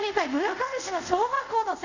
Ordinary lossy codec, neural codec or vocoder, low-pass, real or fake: none; codec, 24 kHz, 0.5 kbps, DualCodec; 7.2 kHz; fake